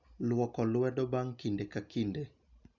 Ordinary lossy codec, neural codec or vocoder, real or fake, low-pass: none; none; real; 7.2 kHz